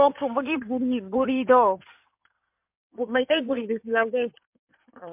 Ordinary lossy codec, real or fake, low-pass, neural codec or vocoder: MP3, 32 kbps; fake; 3.6 kHz; codec, 16 kHz in and 24 kHz out, 2.2 kbps, FireRedTTS-2 codec